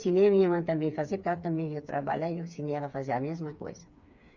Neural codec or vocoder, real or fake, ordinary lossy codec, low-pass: codec, 16 kHz, 4 kbps, FreqCodec, smaller model; fake; Opus, 64 kbps; 7.2 kHz